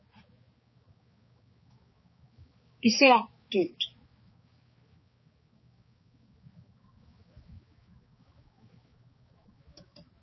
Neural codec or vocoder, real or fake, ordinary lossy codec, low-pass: codec, 16 kHz, 4 kbps, X-Codec, HuBERT features, trained on balanced general audio; fake; MP3, 24 kbps; 7.2 kHz